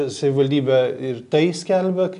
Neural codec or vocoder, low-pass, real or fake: none; 10.8 kHz; real